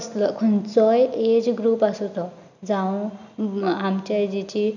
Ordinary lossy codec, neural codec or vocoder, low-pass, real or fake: none; none; 7.2 kHz; real